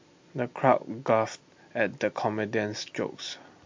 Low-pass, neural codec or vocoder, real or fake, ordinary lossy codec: 7.2 kHz; none; real; MP3, 48 kbps